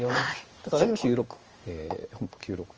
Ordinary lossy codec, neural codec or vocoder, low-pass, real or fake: Opus, 24 kbps; codec, 16 kHz in and 24 kHz out, 1 kbps, XY-Tokenizer; 7.2 kHz; fake